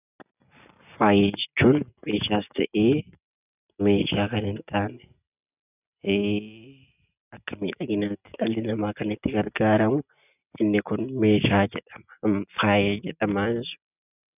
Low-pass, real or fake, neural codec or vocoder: 3.6 kHz; real; none